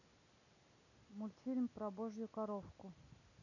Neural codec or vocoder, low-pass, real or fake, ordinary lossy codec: none; 7.2 kHz; real; none